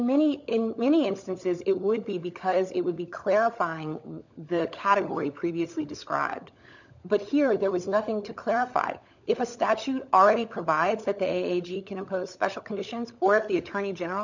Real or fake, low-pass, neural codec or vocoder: fake; 7.2 kHz; codec, 16 kHz, 16 kbps, FunCodec, trained on LibriTTS, 50 frames a second